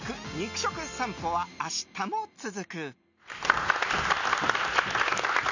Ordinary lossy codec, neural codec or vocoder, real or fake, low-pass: none; none; real; 7.2 kHz